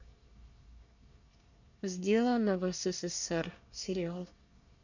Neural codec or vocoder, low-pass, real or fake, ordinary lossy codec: codec, 24 kHz, 1 kbps, SNAC; 7.2 kHz; fake; none